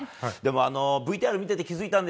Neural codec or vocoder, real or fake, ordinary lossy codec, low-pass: none; real; none; none